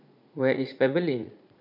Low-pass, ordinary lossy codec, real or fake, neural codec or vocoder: 5.4 kHz; none; fake; vocoder, 44.1 kHz, 80 mel bands, Vocos